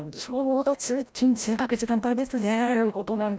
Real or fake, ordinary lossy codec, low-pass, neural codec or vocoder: fake; none; none; codec, 16 kHz, 0.5 kbps, FreqCodec, larger model